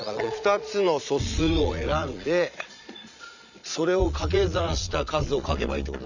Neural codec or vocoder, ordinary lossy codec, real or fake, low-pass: vocoder, 22.05 kHz, 80 mel bands, Vocos; none; fake; 7.2 kHz